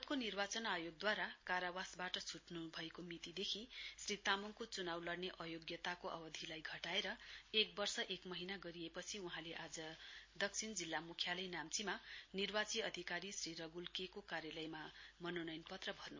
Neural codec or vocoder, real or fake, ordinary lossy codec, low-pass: none; real; MP3, 32 kbps; 7.2 kHz